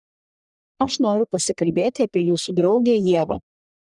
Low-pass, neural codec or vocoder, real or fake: 10.8 kHz; codec, 44.1 kHz, 1.7 kbps, Pupu-Codec; fake